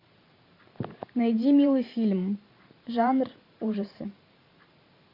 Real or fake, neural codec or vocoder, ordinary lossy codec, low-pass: fake; vocoder, 44.1 kHz, 128 mel bands every 512 samples, BigVGAN v2; AAC, 32 kbps; 5.4 kHz